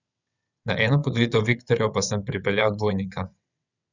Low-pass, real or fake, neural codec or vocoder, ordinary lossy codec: 7.2 kHz; fake; vocoder, 22.05 kHz, 80 mel bands, WaveNeXt; none